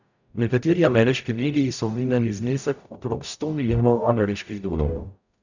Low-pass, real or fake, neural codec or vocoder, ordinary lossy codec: 7.2 kHz; fake; codec, 44.1 kHz, 0.9 kbps, DAC; none